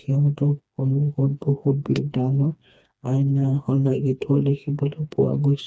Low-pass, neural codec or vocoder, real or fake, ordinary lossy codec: none; codec, 16 kHz, 2 kbps, FreqCodec, smaller model; fake; none